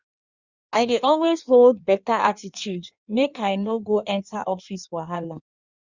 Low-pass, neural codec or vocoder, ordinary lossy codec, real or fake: 7.2 kHz; codec, 16 kHz in and 24 kHz out, 1.1 kbps, FireRedTTS-2 codec; Opus, 64 kbps; fake